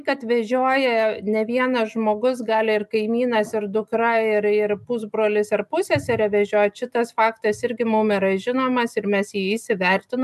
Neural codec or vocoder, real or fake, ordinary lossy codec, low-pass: none; real; MP3, 96 kbps; 14.4 kHz